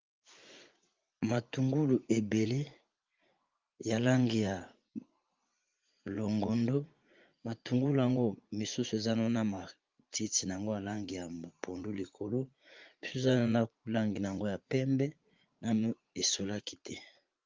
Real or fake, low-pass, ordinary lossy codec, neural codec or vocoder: fake; 7.2 kHz; Opus, 32 kbps; vocoder, 44.1 kHz, 80 mel bands, Vocos